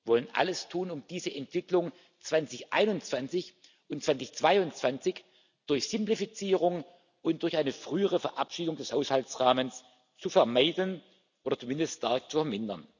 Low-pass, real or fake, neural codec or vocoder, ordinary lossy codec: 7.2 kHz; real; none; none